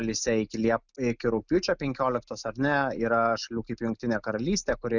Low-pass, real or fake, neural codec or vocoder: 7.2 kHz; real; none